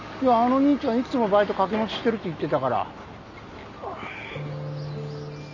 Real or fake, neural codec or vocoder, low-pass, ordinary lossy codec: real; none; 7.2 kHz; none